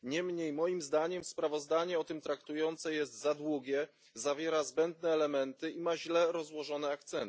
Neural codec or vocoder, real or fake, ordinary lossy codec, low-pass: none; real; none; none